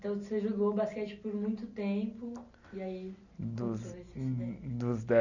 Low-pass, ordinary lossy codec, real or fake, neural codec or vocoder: 7.2 kHz; none; real; none